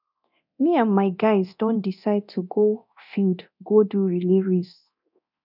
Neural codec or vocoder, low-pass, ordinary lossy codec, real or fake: codec, 24 kHz, 0.9 kbps, DualCodec; 5.4 kHz; none; fake